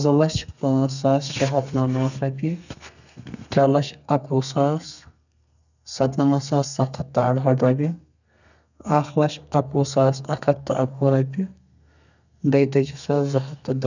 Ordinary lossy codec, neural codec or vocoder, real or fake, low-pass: none; codec, 32 kHz, 1.9 kbps, SNAC; fake; 7.2 kHz